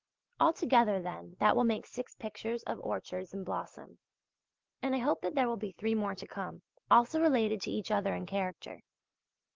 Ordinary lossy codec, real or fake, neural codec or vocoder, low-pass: Opus, 16 kbps; real; none; 7.2 kHz